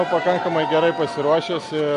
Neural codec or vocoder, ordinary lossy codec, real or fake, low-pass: none; MP3, 48 kbps; real; 10.8 kHz